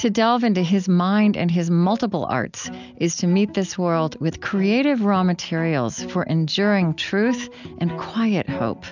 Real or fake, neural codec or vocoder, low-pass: real; none; 7.2 kHz